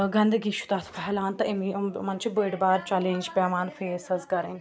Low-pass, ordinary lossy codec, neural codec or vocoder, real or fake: none; none; none; real